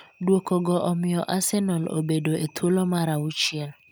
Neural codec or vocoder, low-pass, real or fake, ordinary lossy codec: none; none; real; none